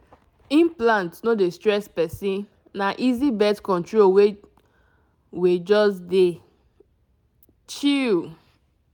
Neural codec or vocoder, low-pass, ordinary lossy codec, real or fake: none; 19.8 kHz; none; real